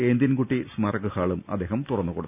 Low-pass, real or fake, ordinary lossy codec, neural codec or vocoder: 3.6 kHz; real; none; none